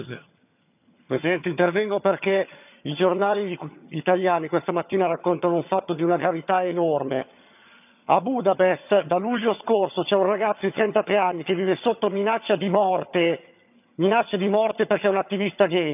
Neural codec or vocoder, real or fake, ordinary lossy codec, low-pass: vocoder, 22.05 kHz, 80 mel bands, HiFi-GAN; fake; none; 3.6 kHz